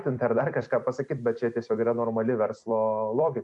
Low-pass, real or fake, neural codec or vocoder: 10.8 kHz; fake; vocoder, 44.1 kHz, 128 mel bands every 256 samples, BigVGAN v2